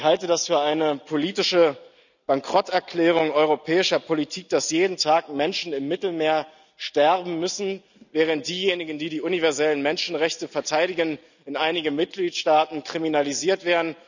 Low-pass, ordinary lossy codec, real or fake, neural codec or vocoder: 7.2 kHz; none; real; none